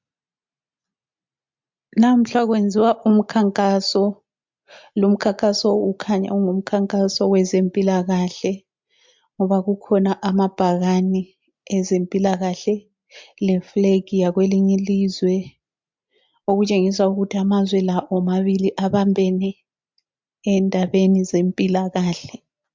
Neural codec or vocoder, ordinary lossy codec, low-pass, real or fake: none; MP3, 64 kbps; 7.2 kHz; real